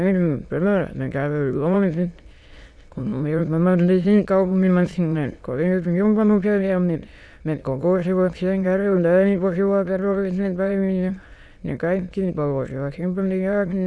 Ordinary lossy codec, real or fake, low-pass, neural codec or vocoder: none; fake; none; autoencoder, 22.05 kHz, a latent of 192 numbers a frame, VITS, trained on many speakers